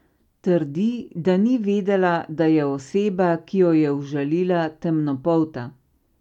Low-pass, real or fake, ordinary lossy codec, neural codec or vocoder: 19.8 kHz; real; none; none